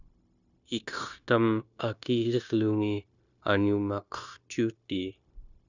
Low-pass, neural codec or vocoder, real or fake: 7.2 kHz; codec, 16 kHz, 0.9 kbps, LongCat-Audio-Codec; fake